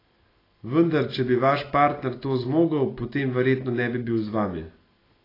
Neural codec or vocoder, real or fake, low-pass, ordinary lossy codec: none; real; 5.4 kHz; AAC, 24 kbps